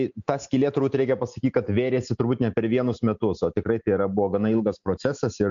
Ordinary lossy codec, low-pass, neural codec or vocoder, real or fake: MP3, 64 kbps; 7.2 kHz; none; real